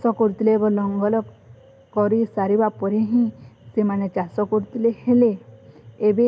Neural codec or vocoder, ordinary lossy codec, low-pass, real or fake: none; none; none; real